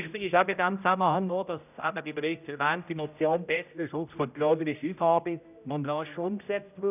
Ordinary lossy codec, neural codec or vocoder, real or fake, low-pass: none; codec, 16 kHz, 0.5 kbps, X-Codec, HuBERT features, trained on general audio; fake; 3.6 kHz